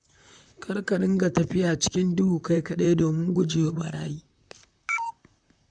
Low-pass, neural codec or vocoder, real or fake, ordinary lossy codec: 9.9 kHz; vocoder, 44.1 kHz, 128 mel bands, Pupu-Vocoder; fake; none